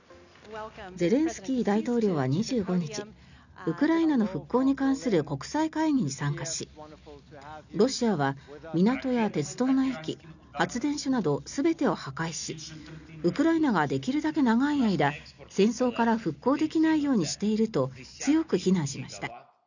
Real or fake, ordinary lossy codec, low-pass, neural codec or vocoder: real; none; 7.2 kHz; none